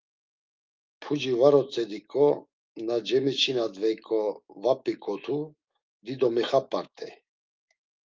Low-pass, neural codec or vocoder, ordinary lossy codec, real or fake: 7.2 kHz; autoencoder, 48 kHz, 128 numbers a frame, DAC-VAE, trained on Japanese speech; Opus, 32 kbps; fake